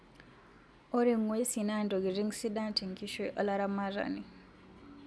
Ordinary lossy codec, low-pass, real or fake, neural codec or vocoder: none; none; real; none